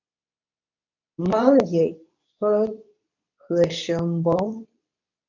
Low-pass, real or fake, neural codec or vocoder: 7.2 kHz; fake; codec, 24 kHz, 0.9 kbps, WavTokenizer, medium speech release version 2